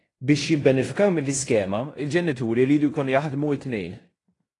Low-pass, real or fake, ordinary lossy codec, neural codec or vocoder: 10.8 kHz; fake; AAC, 32 kbps; codec, 16 kHz in and 24 kHz out, 0.9 kbps, LongCat-Audio-Codec, fine tuned four codebook decoder